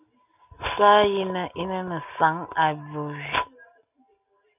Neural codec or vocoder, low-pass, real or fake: none; 3.6 kHz; real